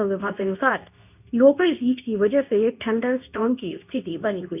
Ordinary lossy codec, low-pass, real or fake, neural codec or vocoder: none; 3.6 kHz; fake; codec, 24 kHz, 0.9 kbps, WavTokenizer, medium speech release version 2